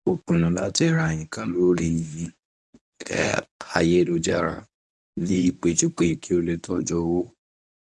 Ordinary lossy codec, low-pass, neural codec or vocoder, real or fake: none; none; codec, 24 kHz, 0.9 kbps, WavTokenizer, medium speech release version 1; fake